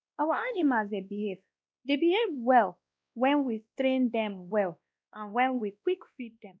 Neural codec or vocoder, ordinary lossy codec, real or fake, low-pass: codec, 16 kHz, 1 kbps, X-Codec, WavLM features, trained on Multilingual LibriSpeech; none; fake; none